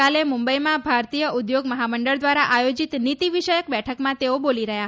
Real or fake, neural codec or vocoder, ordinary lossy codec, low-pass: real; none; none; none